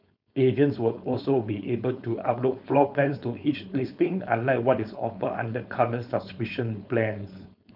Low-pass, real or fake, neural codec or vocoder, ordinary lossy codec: 5.4 kHz; fake; codec, 16 kHz, 4.8 kbps, FACodec; none